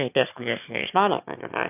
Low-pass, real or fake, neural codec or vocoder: 3.6 kHz; fake; autoencoder, 22.05 kHz, a latent of 192 numbers a frame, VITS, trained on one speaker